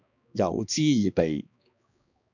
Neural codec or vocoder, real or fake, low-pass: codec, 16 kHz, 2 kbps, X-Codec, HuBERT features, trained on balanced general audio; fake; 7.2 kHz